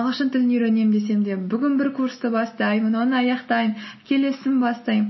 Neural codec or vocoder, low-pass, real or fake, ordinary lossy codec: none; 7.2 kHz; real; MP3, 24 kbps